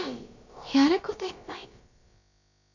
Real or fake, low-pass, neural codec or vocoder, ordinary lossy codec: fake; 7.2 kHz; codec, 16 kHz, about 1 kbps, DyCAST, with the encoder's durations; none